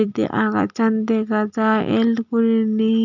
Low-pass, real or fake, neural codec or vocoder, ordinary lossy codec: 7.2 kHz; real; none; none